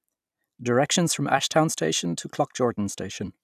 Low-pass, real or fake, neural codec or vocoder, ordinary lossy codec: 14.4 kHz; fake; vocoder, 44.1 kHz, 128 mel bands every 256 samples, BigVGAN v2; none